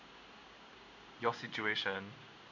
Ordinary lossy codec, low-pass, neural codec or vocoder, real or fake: none; 7.2 kHz; codec, 16 kHz in and 24 kHz out, 1 kbps, XY-Tokenizer; fake